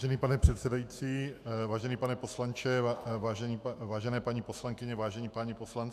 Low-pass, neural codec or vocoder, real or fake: 14.4 kHz; autoencoder, 48 kHz, 128 numbers a frame, DAC-VAE, trained on Japanese speech; fake